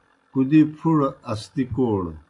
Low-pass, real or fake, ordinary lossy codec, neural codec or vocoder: 10.8 kHz; real; AAC, 64 kbps; none